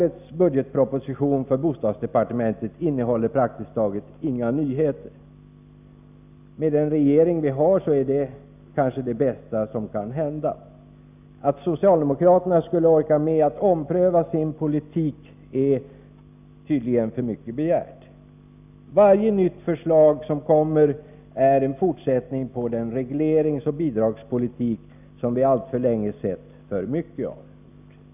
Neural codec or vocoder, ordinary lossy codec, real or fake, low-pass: none; none; real; 3.6 kHz